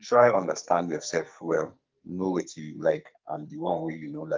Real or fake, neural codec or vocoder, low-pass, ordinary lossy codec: fake; codec, 44.1 kHz, 2.6 kbps, SNAC; 7.2 kHz; Opus, 32 kbps